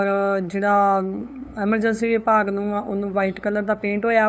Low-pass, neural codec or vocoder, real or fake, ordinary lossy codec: none; codec, 16 kHz, 8 kbps, FunCodec, trained on LibriTTS, 25 frames a second; fake; none